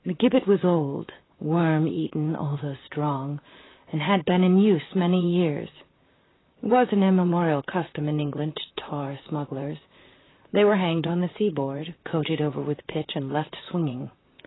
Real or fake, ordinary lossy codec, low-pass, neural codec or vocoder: fake; AAC, 16 kbps; 7.2 kHz; vocoder, 44.1 kHz, 128 mel bands, Pupu-Vocoder